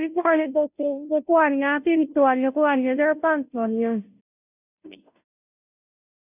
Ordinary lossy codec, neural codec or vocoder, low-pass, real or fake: none; codec, 16 kHz, 0.5 kbps, FunCodec, trained on Chinese and English, 25 frames a second; 3.6 kHz; fake